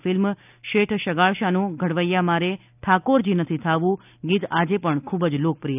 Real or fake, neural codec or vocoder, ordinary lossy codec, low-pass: real; none; none; 3.6 kHz